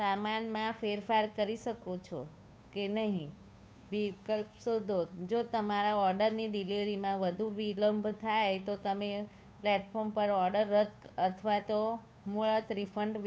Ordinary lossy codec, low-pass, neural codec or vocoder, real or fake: none; none; codec, 16 kHz, 2 kbps, FunCodec, trained on Chinese and English, 25 frames a second; fake